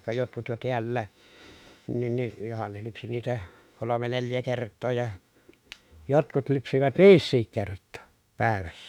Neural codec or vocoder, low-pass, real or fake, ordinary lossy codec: autoencoder, 48 kHz, 32 numbers a frame, DAC-VAE, trained on Japanese speech; 19.8 kHz; fake; none